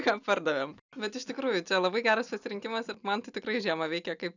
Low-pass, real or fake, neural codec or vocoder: 7.2 kHz; real; none